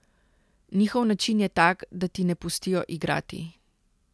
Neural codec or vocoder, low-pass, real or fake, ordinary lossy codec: none; none; real; none